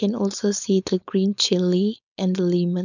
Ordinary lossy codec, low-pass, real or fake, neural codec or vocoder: none; 7.2 kHz; fake; codec, 16 kHz, 4.8 kbps, FACodec